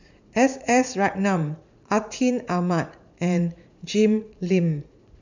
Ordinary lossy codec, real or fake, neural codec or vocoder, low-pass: none; fake; vocoder, 44.1 kHz, 80 mel bands, Vocos; 7.2 kHz